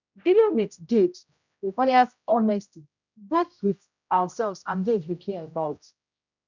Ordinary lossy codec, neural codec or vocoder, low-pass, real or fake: none; codec, 16 kHz, 0.5 kbps, X-Codec, HuBERT features, trained on general audio; 7.2 kHz; fake